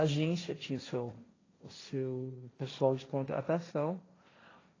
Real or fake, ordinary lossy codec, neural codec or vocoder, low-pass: fake; AAC, 32 kbps; codec, 16 kHz, 1.1 kbps, Voila-Tokenizer; 7.2 kHz